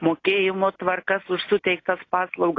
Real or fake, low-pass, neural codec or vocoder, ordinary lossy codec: real; 7.2 kHz; none; AAC, 32 kbps